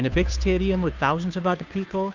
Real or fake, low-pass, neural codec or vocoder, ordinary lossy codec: fake; 7.2 kHz; codec, 16 kHz, 2 kbps, FunCodec, trained on Chinese and English, 25 frames a second; Opus, 64 kbps